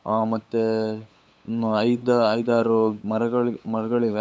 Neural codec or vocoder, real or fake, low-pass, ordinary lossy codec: codec, 16 kHz, 8 kbps, FunCodec, trained on LibriTTS, 25 frames a second; fake; none; none